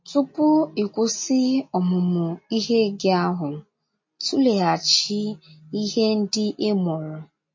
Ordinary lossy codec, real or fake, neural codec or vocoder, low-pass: MP3, 32 kbps; real; none; 7.2 kHz